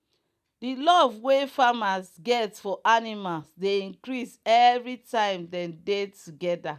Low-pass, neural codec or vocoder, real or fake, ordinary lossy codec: 14.4 kHz; none; real; none